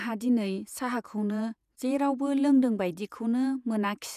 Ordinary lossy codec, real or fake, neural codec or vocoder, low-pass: none; fake; vocoder, 48 kHz, 128 mel bands, Vocos; 14.4 kHz